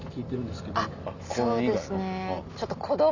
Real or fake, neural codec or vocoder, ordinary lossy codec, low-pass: real; none; none; 7.2 kHz